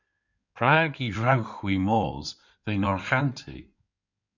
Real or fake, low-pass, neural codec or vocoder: fake; 7.2 kHz; codec, 16 kHz in and 24 kHz out, 2.2 kbps, FireRedTTS-2 codec